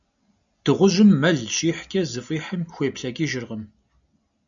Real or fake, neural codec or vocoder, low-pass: real; none; 7.2 kHz